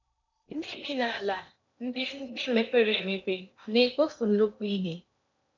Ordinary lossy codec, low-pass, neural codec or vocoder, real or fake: none; 7.2 kHz; codec, 16 kHz in and 24 kHz out, 0.8 kbps, FocalCodec, streaming, 65536 codes; fake